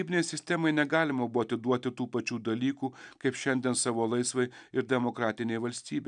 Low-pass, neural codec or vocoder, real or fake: 9.9 kHz; none; real